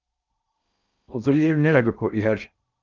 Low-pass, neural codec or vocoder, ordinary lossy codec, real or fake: 7.2 kHz; codec, 16 kHz in and 24 kHz out, 0.6 kbps, FocalCodec, streaming, 4096 codes; Opus, 24 kbps; fake